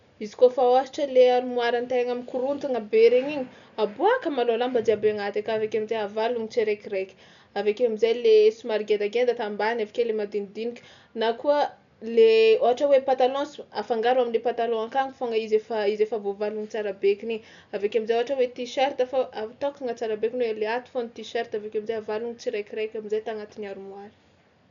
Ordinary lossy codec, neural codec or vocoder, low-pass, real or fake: none; none; 7.2 kHz; real